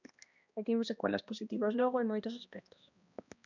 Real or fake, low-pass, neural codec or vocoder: fake; 7.2 kHz; codec, 16 kHz, 1 kbps, X-Codec, HuBERT features, trained on balanced general audio